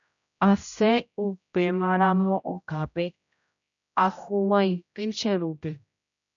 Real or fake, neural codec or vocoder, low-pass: fake; codec, 16 kHz, 0.5 kbps, X-Codec, HuBERT features, trained on general audio; 7.2 kHz